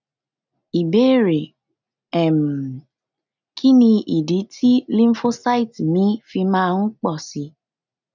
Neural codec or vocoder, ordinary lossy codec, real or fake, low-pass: none; none; real; 7.2 kHz